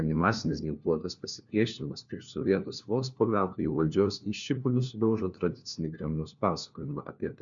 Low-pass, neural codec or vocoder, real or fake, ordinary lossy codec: 7.2 kHz; codec, 16 kHz, 1 kbps, FunCodec, trained on LibriTTS, 50 frames a second; fake; MP3, 64 kbps